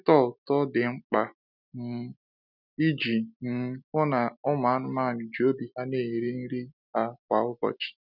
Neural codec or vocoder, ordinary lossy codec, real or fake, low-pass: none; none; real; 5.4 kHz